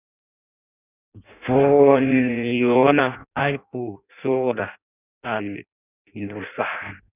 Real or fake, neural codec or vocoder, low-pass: fake; codec, 16 kHz in and 24 kHz out, 0.6 kbps, FireRedTTS-2 codec; 3.6 kHz